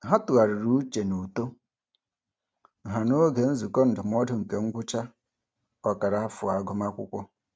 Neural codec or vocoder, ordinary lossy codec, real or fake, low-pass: none; none; real; none